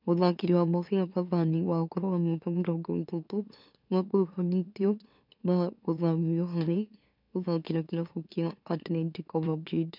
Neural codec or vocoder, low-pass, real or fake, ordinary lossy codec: autoencoder, 44.1 kHz, a latent of 192 numbers a frame, MeloTTS; 5.4 kHz; fake; none